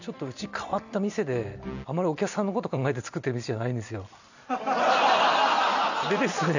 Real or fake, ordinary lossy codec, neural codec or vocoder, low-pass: real; none; none; 7.2 kHz